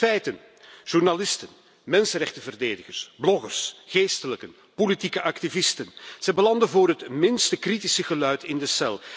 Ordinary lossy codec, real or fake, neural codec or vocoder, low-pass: none; real; none; none